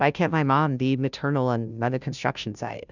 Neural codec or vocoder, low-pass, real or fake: codec, 16 kHz, 0.5 kbps, FunCodec, trained on Chinese and English, 25 frames a second; 7.2 kHz; fake